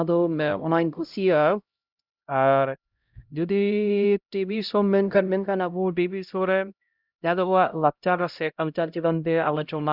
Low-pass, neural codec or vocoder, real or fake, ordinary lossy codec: 5.4 kHz; codec, 16 kHz, 0.5 kbps, X-Codec, HuBERT features, trained on LibriSpeech; fake; Opus, 64 kbps